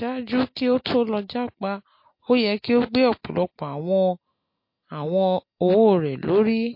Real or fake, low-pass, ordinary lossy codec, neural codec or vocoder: real; 5.4 kHz; MP3, 32 kbps; none